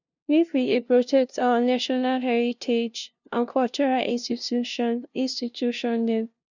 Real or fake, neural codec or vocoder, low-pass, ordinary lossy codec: fake; codec, 16 kHz, 0.5 kbps, FunCodec, trained on LibriTTS, 25 frames a second; 7.2 kHz; none